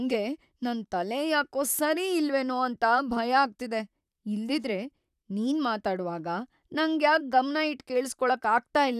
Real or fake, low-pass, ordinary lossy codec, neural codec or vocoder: fake; 14.4 kHz; none; vocoder, 44.1 kHz, 128 mel bands, Pupu-Vocoder